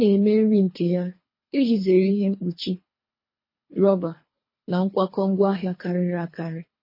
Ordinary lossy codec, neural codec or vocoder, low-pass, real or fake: MP3, 24 kbps; codec, 24 kHz, 3 kbps, HILCodec; 5.4 kHz; fake